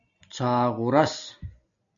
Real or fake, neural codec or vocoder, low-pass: real; none; 7.2 kHz